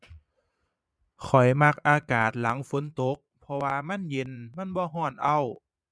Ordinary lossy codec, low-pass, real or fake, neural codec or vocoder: none; none; fake; vocoder, 22.05 kHz, 80 mel bands, Vocos